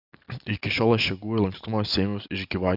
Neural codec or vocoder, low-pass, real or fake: none; 5.4 kHz; real